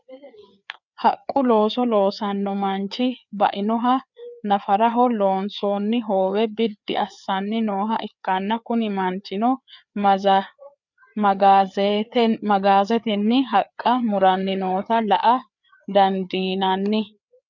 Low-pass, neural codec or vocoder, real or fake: 7.2 kHz; codec, 16 kHz, 4 kbps, FreqCodec, larger model; fake